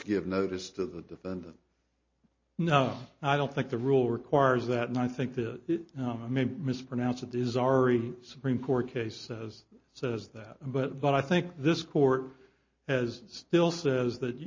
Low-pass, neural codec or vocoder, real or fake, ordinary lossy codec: 7.2 kHz; none; real; MP3, 32 kbps